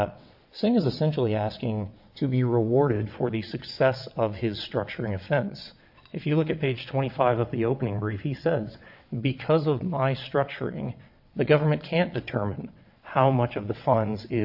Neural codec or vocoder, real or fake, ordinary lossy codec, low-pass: vocoder, 22.05 kHz, 80 mel bands, WaveNeXt; fake; AAC, 48 kbps; 5.4 kHz